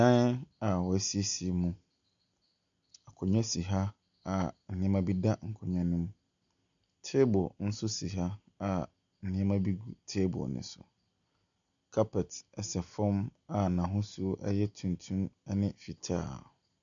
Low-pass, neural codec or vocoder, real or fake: 7.2 kHz; none; real